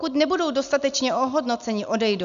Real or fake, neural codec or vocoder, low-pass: real; none; 7.2 kHz